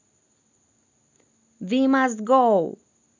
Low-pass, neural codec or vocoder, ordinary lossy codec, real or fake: 7.2 kHz; none; none; real